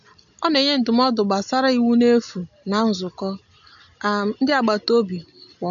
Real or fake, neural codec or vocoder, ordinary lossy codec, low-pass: real; none; none; 7.2 kHz